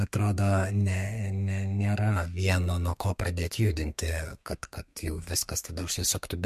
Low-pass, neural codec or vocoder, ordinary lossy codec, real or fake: 14.4 kHz; codec, 32 kHz, 1.9 kbps, SNAC; MP3, 64 kbps; fake